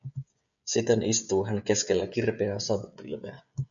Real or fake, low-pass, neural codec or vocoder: fake; 7.2 kHz; codec, 16 kHz, 16 kbps, FreqCodec, smaller model